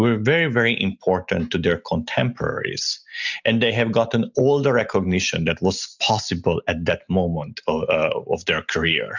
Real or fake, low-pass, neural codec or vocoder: real; 7.2 kHz; none